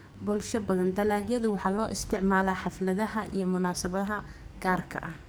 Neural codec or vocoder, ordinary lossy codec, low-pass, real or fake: codec, 44.1 kHz, 2.6 kbps, SNAC; none; none; fake